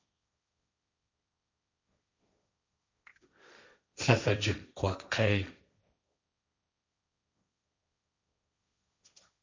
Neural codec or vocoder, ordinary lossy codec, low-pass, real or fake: codec, 16 kHz, 1.1 kbps, Voila-Tokenizer; MP3, 64 kbps; 7.2 kHz; fake